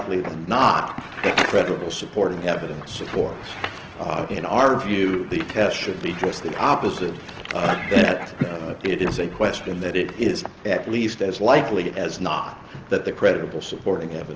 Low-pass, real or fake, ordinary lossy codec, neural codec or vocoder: 7.2 kHz; real; Opus, 16 kbps; none